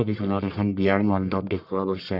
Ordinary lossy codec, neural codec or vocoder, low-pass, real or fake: none; codec, 44.1 kHz, 1.7 kbps, Pupu-Codec; 5.4 kHz; fake